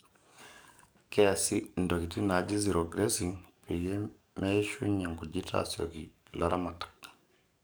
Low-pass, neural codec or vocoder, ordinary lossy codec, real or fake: none; codec, 44.1 kHz, 7.8 kbps, DAC; none; fake